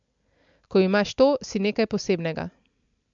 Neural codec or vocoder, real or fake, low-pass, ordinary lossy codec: none; real; 7.2 kHz; MP3, 64 kbps